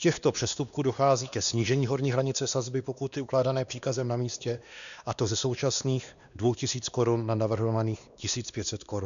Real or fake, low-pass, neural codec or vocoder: fake; 7.2 kHz; codec, 16 kHz, 2 kbps, X-Codec, WavLM features, trained on Multilingual LibriSpeech